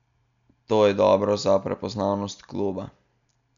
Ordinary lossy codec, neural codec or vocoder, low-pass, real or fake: none; none; 7.2 kHz; real